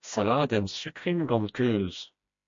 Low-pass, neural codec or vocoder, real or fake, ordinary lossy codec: 7.2 kHz; codec, 16 kHz, 1 kbps, FreqCodec, smaller model; fake; MP3, 48 kbps